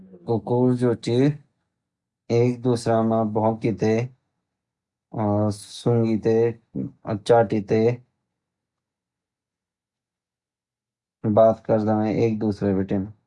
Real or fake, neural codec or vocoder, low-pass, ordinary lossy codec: real; none; 10.8 kHz; Opus, 64 kbps